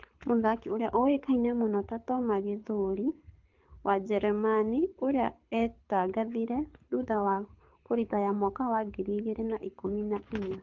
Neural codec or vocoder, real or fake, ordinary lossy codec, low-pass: codec, 24 kHz, 6 kbps, HILCodec; fake; Opus, 24 kbps; 7.2 kHz